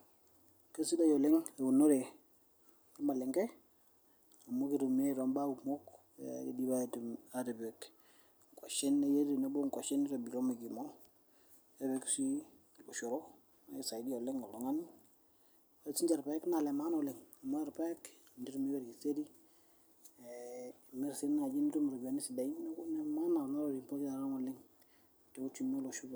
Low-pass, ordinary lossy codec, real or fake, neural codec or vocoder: none; none; real; none